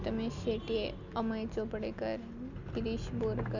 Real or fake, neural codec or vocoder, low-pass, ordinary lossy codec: real; none; 7.2 kHz; none